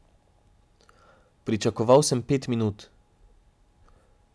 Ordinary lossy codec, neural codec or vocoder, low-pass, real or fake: none; none; none; real